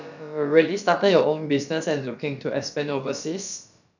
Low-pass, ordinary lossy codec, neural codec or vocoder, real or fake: 7.2 kHz; none; codec, 16 kHz, about 1 kbps, DyCAST, with the encoder's durations; fake